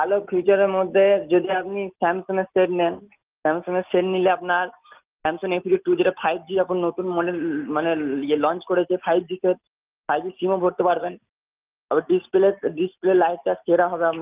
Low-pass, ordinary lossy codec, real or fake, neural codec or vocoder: 3.6 kHz; Opus, 32 kbps; real; none